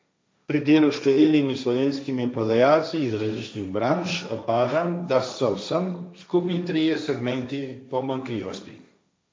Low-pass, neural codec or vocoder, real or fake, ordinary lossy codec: none; codec, 16 kHz, 1.1 kbps, Voila-Tokenizer; fake; none